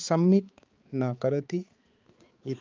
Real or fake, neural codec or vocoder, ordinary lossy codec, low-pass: fake; codec, 16 kHz, 4 kbps, X-Codec, HuBERT features, trained on balanced general audio; Opus, 32 kbps; 7.2 kHz